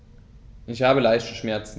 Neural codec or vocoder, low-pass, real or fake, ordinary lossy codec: none; none; real; none